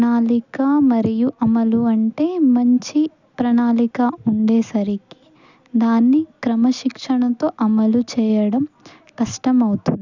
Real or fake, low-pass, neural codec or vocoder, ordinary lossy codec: real; 7.2 kHz; none; none